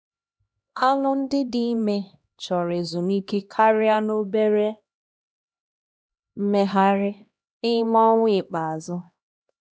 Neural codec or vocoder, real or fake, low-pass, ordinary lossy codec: codec, 16 kHz, 1 kbps, X-Codec, HuBERT features, trained on LibriSpeech; fake; none; none